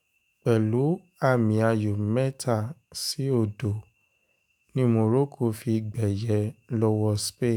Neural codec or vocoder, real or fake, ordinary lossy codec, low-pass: autoencoder, 48 kHz, 128 numbers a frame, DAC-VAE, trained on Japanese speech; fake; none; none